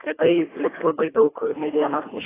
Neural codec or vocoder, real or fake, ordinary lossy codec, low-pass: codec, 24 kHz, 1.5 kbps, HILCodec; fake; AAC, 16 kbps; 3.6 kHz